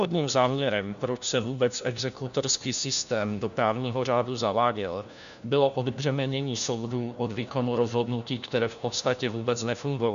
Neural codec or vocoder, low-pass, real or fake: codec, 16 kHz, 1 kbps, FunCodec, trained on LibriTTS, 50 frames a second; 7.2 kHz; fake